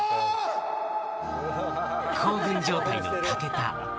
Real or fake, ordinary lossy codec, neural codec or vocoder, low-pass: real; none; none; none